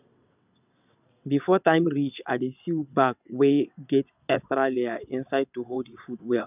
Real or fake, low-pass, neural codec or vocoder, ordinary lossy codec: fake; 3.6 kHz; vocoder, 22.05 kHz, 80 mel bands, WaveNeXt; none